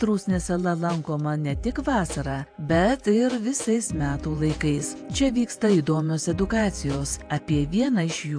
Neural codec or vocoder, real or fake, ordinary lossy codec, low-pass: vocoder, 48 kHz, 128 mel bands, Vocos; fake; AAC, 64 kbps; 9.9 kHz